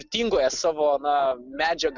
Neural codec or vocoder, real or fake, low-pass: none; real; 7.2 kHz